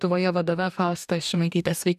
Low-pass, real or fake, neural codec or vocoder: 14.4 kHz; fake; codec, 44.1 kHz, 2.6 kbps, DAC